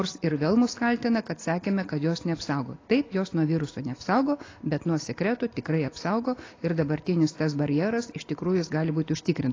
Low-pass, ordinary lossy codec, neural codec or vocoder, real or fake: 7.2 kHz; AAC, 32 kbps; none; real